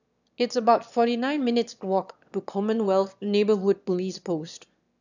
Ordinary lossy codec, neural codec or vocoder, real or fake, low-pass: none; autoencoder, 22.05 kHz, a latent of 192 numbers a frame, VITS, trained on one speaker; fake; 7.2 kHz